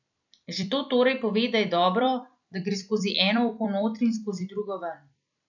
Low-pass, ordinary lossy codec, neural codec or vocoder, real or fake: 7.2 kHz; none; vocoder, 44.1 kHz, 128 mel bands every 256 samples, BigVGAN v2; fake